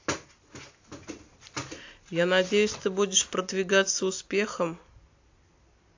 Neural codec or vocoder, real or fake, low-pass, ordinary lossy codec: none; real; 7.2 kHz; AAC, 48 kbps